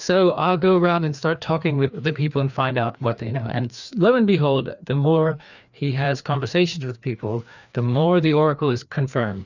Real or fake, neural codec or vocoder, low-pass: fake; codec, 16 kHz, 2 kbps, FreqCodec, larger model; 7.2 kHz